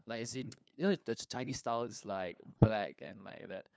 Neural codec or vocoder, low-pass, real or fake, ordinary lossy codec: codec, 16 kHz, 2 kbps, FunCodec, trained on LibriTTS, 25 frames a second; none; fake; none